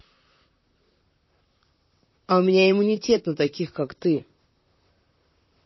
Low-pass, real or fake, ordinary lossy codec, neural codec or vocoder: 7.2 kHz; fake; MP3, 24 kbps; vocoder, 44.1 kHz, 128 mel bands, Pupu-Vocoder